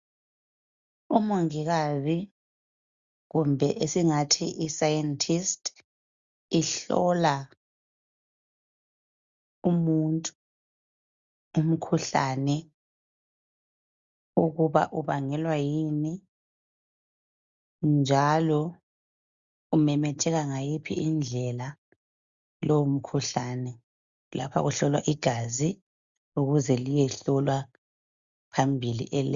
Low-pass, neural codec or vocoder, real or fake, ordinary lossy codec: 7.2 kHz; none; real; Opus, 64 kbps